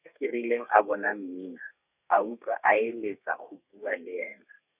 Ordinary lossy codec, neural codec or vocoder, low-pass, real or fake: none; codec, 32 kHz, 1.9 kbps, SNAC; 3.6 kHz; fake